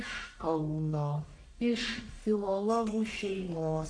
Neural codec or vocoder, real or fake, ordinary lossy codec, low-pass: codec, 44.1 kHz, 1.7 kbps, Pupu-Codec; fake; AAC, 48 kbps; 9.9 kHz